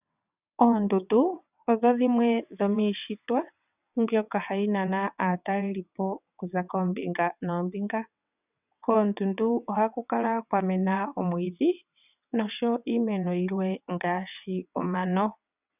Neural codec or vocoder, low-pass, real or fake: vocoder, 22.05 kHz, 80 mel bands, WaveNeXt; 3.6 kHz; fake